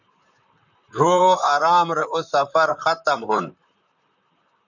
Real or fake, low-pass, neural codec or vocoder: fake; 7.2 kHz; vocoder, 44.1 kHz, 128 mel bands, Pupu-Vocoder